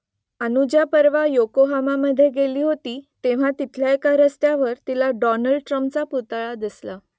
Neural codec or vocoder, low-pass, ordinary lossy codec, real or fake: none; none; none; real